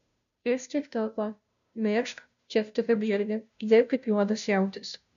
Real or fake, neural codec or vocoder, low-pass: fake; codec, 16 kHz, 0.5 kbps, FunCodec, trained on Chinese and English, 25 frames a second; 7.2 kHz